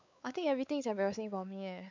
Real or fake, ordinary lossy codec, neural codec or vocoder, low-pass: fake; MP3, 64 kbps; codec, 16 kHz, 4 kbps, X-Codec, WavLM features, trained on Multilingual LibriSpeech; 7.2 kHz